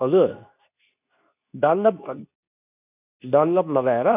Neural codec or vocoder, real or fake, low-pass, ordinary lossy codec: codec, 24 kHz, 0.9 kbps, WavTokenizer, medium speech release version 2; fake; 3.6 kHz; none